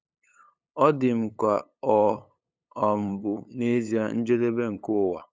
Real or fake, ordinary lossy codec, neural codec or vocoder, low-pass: fake; none; codec, 16 kHz, 8 kbps, FunCodec, trained on LibriTTS, 25 frames a second; none